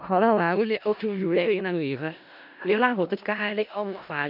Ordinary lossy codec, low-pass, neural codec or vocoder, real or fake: AAC, 48 kbps; 5.4 kHz; codec, 16 kHz in and 24 kHz out, 0.4 kbps, LongCat-Audio-Codec, four codebook decoder; fake